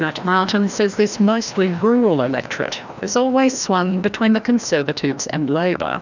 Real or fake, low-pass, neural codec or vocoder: fake; 7.2 kHz; codec, 16 kHz, 1 kbps, FreqCodec, larger model